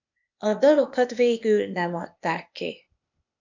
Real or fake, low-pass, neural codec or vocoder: fake; 7.2 kHz; codec, 16 kHz, 0.8 kbps, ZipCodec